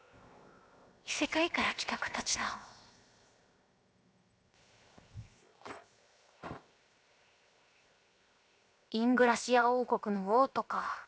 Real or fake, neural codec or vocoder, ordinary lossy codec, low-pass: fake; codec, 16 kHz, 0.7 kbps, FocalCodec; none; none